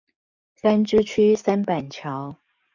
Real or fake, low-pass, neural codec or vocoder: fake; 7.2 kHz; codec, 44.1 kHz, 7.8 kbps, DAC